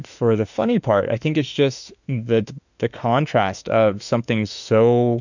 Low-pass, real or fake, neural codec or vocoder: 7.2 kHz; fake; autoencoder, 48 kHz, 32 numbers a frame, DAC-VAE, trained on Japanese speech